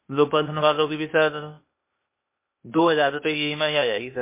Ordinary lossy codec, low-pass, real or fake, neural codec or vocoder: MP3, 24 kbps; 3.6 kHz; fake; codec, 16 kHz, 0.8 kbps, ZipCodec